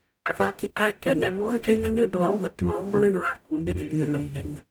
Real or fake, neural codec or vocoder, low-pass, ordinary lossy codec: fake; codec, 44.1 kHz, 0.9 kbps, DAC; none; none